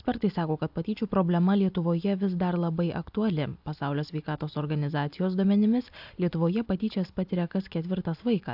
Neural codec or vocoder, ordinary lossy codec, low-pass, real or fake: none; AAC, 48 kbps; 5.4 kHz; real